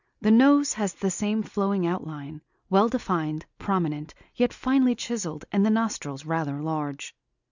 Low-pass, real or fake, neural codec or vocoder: 7.2 kHz; real; none